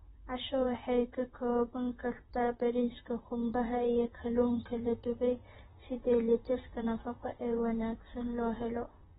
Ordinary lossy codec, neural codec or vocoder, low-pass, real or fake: AAC, 16 kbps; vocoder, 44.1 kHz, 128 mel bands, Pupu-Vocoder; 19.8 kHz; fake